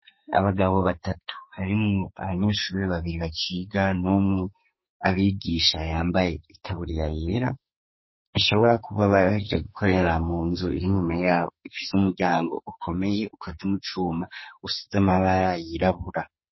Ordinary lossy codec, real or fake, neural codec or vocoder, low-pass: MP3, 24 kbps; fake; codec, 44.1 kHz, 2.6 kbps, SNAC; 7.2 kHz